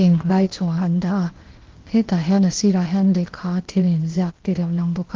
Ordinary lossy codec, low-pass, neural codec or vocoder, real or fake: Opus, 16 kbps; 7.2 kHz; codec, 16 kHz, 0.8 kbps, ZipCodec; fake